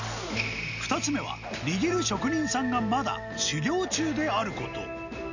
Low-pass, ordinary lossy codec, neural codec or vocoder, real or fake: 7.2 kHz; none; none; real